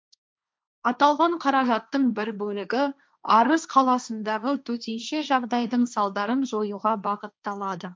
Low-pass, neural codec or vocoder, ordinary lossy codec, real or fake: none; codec, 16 kHz, 1.1 kbps, Voila-Tokenizer; none; fake